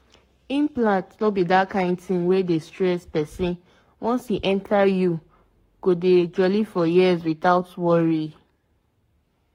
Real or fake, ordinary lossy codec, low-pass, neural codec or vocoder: fake; AAC, 48 kbps; 19.8 kHz; codec, 44.1 kHz, 7.8 kbps, Pupu-Codec